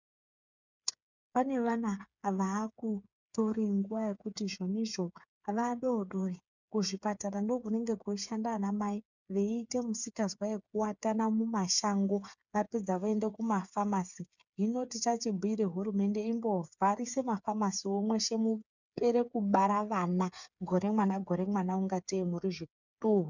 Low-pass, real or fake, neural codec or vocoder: 7.2 kHz; fake; codec, 16 kHz, 8 kbps, FreqCodec, smaller model